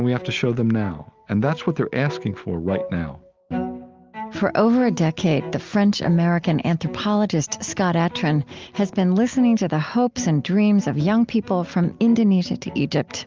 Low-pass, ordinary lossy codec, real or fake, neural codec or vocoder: 7.2 kHz; Opus, 24 kbps; real; none